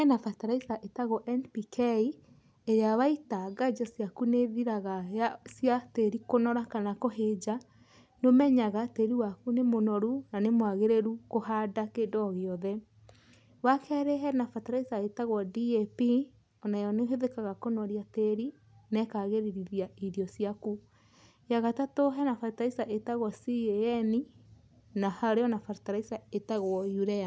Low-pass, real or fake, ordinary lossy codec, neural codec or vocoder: none; real; none; none